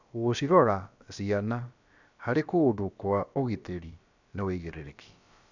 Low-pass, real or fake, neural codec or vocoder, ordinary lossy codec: 7.2 kHz; fake; codec, 16 kHz, about 1 kbps, DyCAST, with the encoder's durations; none